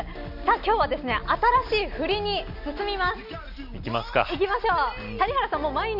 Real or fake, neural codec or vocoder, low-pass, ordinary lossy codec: real; none; 5.4 kHz; none